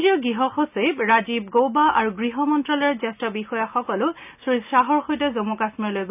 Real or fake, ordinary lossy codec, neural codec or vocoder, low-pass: real; none; none; 3.6 kHz